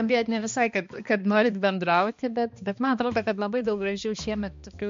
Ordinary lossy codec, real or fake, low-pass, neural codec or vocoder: MP3, 48 kbps; fake; 7.2 kHz; codec, 16 kHz, 2 kbps, X-Codec, HuBERT features, trained on balanced general audio